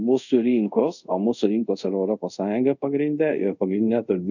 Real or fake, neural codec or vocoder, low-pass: fake; codec, 24 kHz, 0.5 kbps, DualCodec; 7.2 kHz